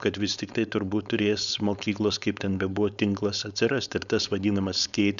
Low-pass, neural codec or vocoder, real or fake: 7.2 kHz; codec, 16 kHz, 4.8 kbps, FACodec; fake